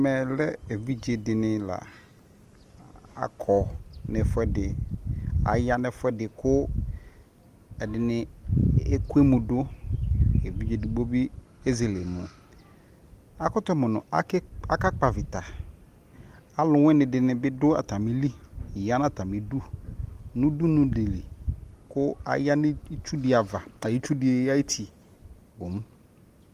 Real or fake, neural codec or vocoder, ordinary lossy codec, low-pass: real; none; Opus, 24 kbps; 14.4 kHz